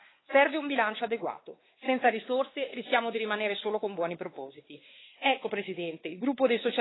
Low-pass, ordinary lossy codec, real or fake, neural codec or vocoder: 7.2 kHz; AAC, 16 kbps; fake; codec, 16 kHz, 4 kbps, X-Codec, WavLM features, trained on Multilingual LibriSpeech